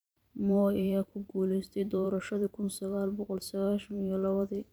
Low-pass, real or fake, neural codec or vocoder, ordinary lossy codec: none; fake; vocoder, 44.1 kHz, 128 mel bands every 512 samples, BigVGAN v2; none